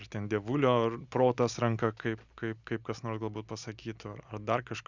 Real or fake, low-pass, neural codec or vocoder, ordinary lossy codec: real; 7.2 kHz; none; Opus, 64 kbps